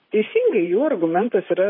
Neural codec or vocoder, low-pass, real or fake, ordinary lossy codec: vocoder, 44.1 kHz, 128 mel bands, Pupu-Vocoder; 5.4 kHz; fake; MP3, 24 kbps